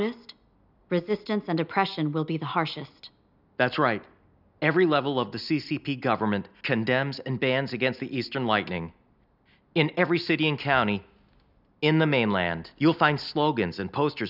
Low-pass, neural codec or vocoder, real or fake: 5.4 kHz; none; real